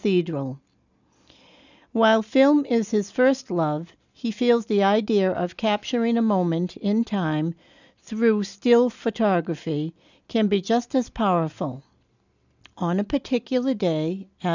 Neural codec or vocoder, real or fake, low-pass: none; real; 7.2 kHz